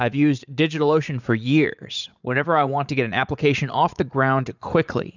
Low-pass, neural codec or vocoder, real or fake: 7.2 kHz; vocoder, 44.1 kHz, 80 mel bands, Vocos; fake